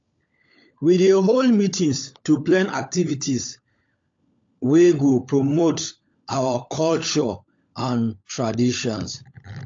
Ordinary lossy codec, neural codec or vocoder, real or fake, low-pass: AAC, 48 kbps; codec, 16 kHz, 16 kbps, FunCodec, trained on LibriTTS, 50 frames a second; fake; 7.2 kHz